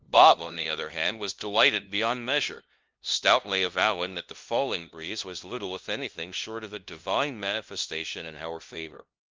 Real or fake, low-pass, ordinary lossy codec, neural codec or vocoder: fake; 7.2 kHz; Opus, 32 kbps; codec, 16 kHz, 0.5 kbps, FunCodec, trained on LibriTTS, 25 frames a second